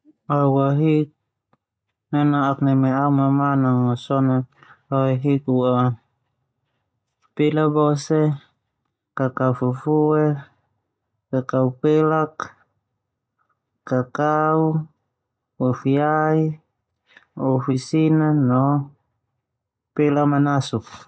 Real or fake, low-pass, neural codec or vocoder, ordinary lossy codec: real; none; none; none